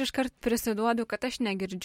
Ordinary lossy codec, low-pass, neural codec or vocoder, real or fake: MP3, 64 kbps; 19.8 kHz; none; real